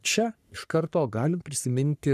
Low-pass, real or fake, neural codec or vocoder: 14.4 kHz; fake; codec, 44.1 kHz, 3.4 kbps, Pupu-Codec